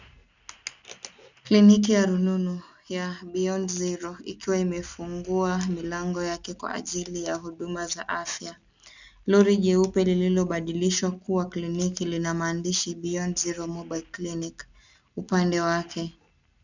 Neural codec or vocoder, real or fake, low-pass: none; real; 7.2 kHz